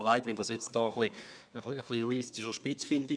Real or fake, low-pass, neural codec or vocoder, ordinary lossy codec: fake; 9.9 kHz; codec, 24 kHz, 1 kbps, SNAC; none